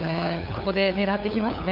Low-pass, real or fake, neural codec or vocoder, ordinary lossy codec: 5.4 kHz; fake; codec, 16 kHz, 8 kbps, FunCodec, trained on LibriTTS, 25 frames a second; none